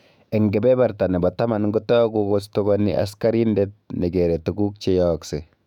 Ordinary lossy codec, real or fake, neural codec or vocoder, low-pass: none; fake; autoencoder, 48 kHz, 128 numbers a frame, DAC-VAE, trained on Japanese speech; 19.8 kHz